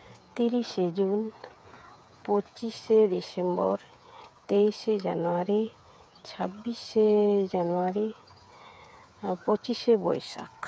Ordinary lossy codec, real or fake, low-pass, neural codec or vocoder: none; fake; none; codec, 16 kHz, 8 kbps, FreqCodec, smaller model